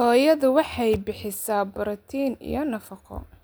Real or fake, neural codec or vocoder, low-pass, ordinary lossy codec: real; none; none; none